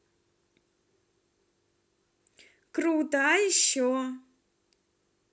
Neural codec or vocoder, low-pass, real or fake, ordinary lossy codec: none; none; real; none